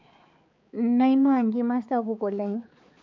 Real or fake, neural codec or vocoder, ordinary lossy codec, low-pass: fake; codec, 16 kHz, 4 kbps, X-Codec, HuBERT features, trained on balanced general audio; MP3, 48 kbps; 7.2 kHz